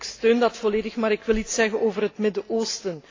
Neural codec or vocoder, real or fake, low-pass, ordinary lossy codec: none; real; 7.2 kHz; AAC, 32 kbps